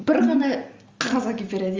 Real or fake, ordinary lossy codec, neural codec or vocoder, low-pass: real; Opus, 32 kbps; none; 7.2 kHz